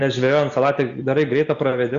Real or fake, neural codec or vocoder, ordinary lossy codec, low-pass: real; none; Opus, 64 kbps; 7.2 kHz